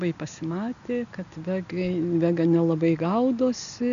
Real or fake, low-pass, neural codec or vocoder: real; 7.2 kHz; none